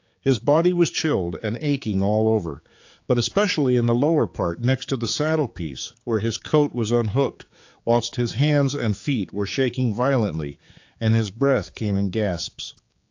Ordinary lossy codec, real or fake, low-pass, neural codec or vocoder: AAC, 48 kbps; fake; 7.2 kHz; codec, 16 kHz, 4 kbps, X-Codec, HuBERT features, trained on general audio